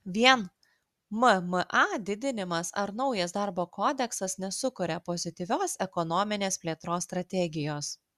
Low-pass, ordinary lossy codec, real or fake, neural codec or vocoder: 14.4 kHz; Opus, 64 kbps; real; none